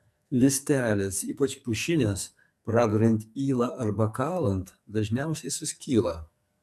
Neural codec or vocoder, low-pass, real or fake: codec, 44.1 kHz, 2.6 kbps, SNAC; 14.4 kHz; fake